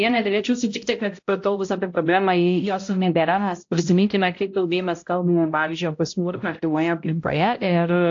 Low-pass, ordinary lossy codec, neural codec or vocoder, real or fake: 7.2 kHz; AAC, 48 kbps; codec, 16 kHz, 0.5 kbps, X-Codec, HuBERT features, trained on balanced general audio; fake